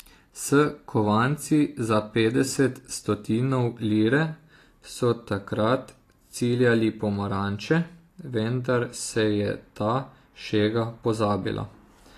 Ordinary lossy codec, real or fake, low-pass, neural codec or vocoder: AAC, 48 kbps; real; 14.4 kHz; none